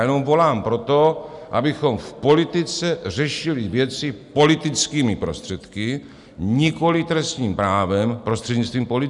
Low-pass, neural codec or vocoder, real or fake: 10.8 kHz; none; real